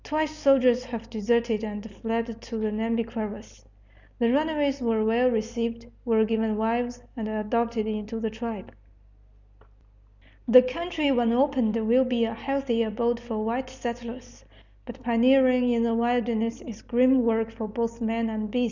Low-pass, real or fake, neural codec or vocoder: 7.2 kHz; real; none